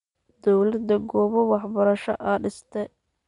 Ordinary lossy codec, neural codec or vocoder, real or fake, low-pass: MP3, 64 kbps; none; real; 10.8 kHz